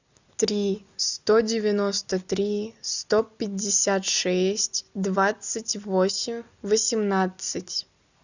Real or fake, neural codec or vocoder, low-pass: real; none; 7.2 kHz